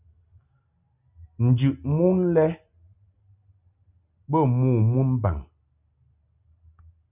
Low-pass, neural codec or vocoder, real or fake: 3.6 kHz; none; real